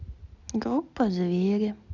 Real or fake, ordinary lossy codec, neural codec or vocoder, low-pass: real; none; none; 7.2 kHz